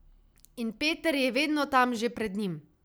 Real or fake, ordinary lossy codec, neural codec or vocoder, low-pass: real; none; none; none